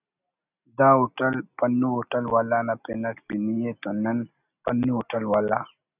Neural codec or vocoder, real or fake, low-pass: none; real; 3.6 kHz